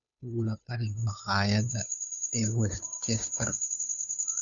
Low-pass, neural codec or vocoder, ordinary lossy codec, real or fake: 7.2 kHz; codec, 16 kHz, 2 kbps, FunCodec, trained on Chinese and English, 25 frames a second; none; fake